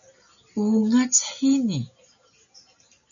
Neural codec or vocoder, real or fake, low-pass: none; real; 7.2 kHz